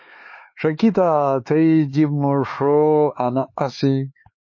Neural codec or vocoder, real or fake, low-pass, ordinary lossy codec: codec, 16 kHz, 4 kbps, X-Codec, HuBERT features, trained on LibriSpeech; fake; 7.2 kHz; MP3, 32 kbps